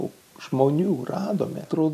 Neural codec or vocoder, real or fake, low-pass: none; real; 14.4 kHz